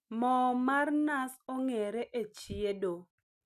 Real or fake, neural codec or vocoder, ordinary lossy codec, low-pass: real; none; none; 14.4 kHz